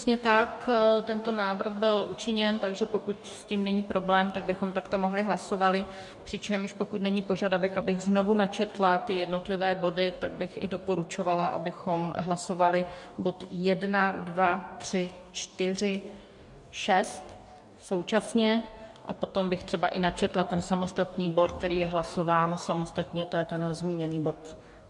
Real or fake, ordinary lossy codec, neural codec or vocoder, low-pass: fake; MP3, 64 kbps; codec, 44.1 kHz, 2.6 kbps, DAC; 10.8 kHz